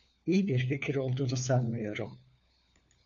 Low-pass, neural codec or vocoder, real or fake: 7.2 kHz; codec, 16 kHz, 4 kbps, FunCodec, trained on LibriTTS, 50 frames a second; fake